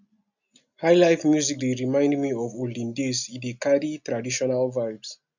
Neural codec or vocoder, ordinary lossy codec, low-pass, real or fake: none; none; 7.2 kHz; real